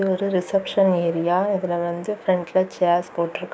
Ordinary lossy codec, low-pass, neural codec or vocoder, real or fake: none; none; codec, 16 kHz, 6 kbps, DAC; fake